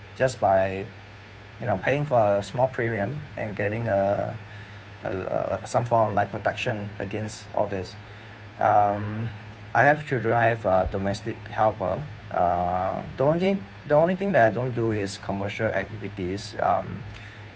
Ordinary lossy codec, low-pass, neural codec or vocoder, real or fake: none; none; codec, 16 kHz, 2 kbps, FunCodec, trained on Chinese and English, 25 frames a second; fake